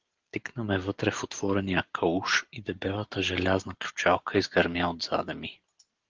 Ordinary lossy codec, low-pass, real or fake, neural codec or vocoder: Opus, 16 kbps; 7.2 kHz; real; none